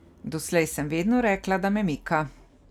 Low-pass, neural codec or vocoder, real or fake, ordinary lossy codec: 19.8 kHz; none; real; none